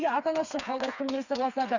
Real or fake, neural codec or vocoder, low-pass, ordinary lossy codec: fake; codec, 16 kHz, 4 kbps, FreqCodec, smaller model; 7.2 kHz; none